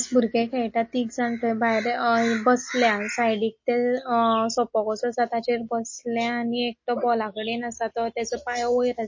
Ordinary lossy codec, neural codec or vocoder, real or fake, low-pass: MP3, 32 kbps; none; real; 7.2 kHz